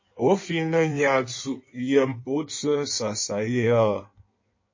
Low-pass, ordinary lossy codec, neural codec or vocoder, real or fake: 7.2 kHz; MP3, 32 kbps; codec, 16 kHz in and 24 kHz out, 1.1 kbps, FireRedTTS-2 codec; fake